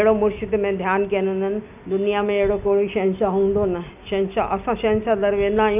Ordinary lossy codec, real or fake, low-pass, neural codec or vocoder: none; real; 3.6 kHz; none